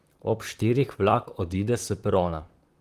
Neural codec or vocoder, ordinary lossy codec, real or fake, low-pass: vocoder, 44.1 kHz, 128 mel bands, Pupu-Vocoder; Opus, 24 kbps; fake; 14.4 kHz